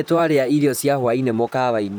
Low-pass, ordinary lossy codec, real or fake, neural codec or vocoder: none; none; fake; vocoder, 44.1 kHz, 128 mel bands, Pupu-Vocoder